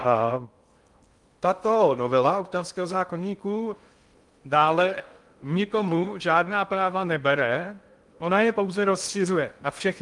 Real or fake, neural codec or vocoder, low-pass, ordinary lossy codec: fake; codec, 16 kHz in and 24 kHz out, 0.6 kbps, FocalCodec, streaming, 2048 codes; 10.8 kHz; Opus, 32 kbps